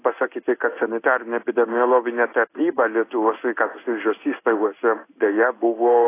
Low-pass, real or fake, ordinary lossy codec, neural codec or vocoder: 3.6 kHz; fake; AAC, 24 kbps; codec, 16 kHz in and 24 kHz out, 1 kbps, XY-Tokenizer